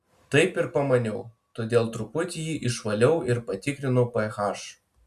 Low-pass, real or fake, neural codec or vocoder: 14.4 kHz; real; none